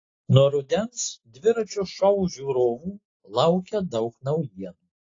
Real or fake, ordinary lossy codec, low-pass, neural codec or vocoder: real; AAC, 32 kbps; 7.2 kHz; none